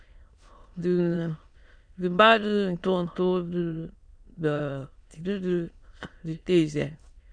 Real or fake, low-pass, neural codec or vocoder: fake; 9.9 kHz; autoencoder, 22.05 kHz, a latent of 192 numbers a frame, VITS, trained on many speakers